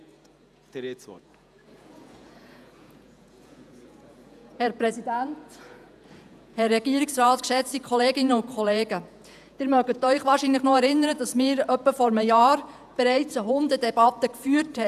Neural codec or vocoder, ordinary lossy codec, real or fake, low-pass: vocoder, 48 kHz, 128 mel bands, Vocos; none; fake; 14.4 kHz